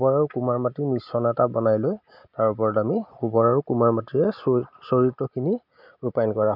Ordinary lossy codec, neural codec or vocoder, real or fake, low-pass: none; none; real; 5.4 kHz